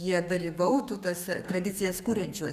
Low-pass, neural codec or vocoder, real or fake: 14.4 kHz; codec, 32 kHz, 1.9 kbps, SNAC; fake